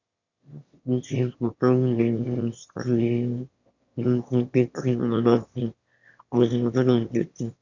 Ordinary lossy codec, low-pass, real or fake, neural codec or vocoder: Opus, 64 kbps; 7.2 kHz; fake; autoencoder, 22.05 kHz, a latent of 192 numbers a frame, VITS, trained on one speaker